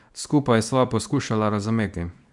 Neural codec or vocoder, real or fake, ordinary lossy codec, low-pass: codec, 24 kHz, 0.9 kbps, WavTokenizer, small release; fake; none; 10.8 kHz